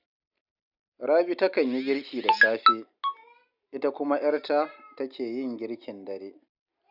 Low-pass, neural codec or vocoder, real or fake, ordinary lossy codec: 5.4 kHz; none; real; none